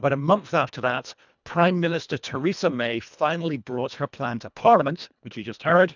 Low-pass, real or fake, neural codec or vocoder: 7.2 kHz; fake; codec, 24 kHz, 1.5 kbps, HILCodec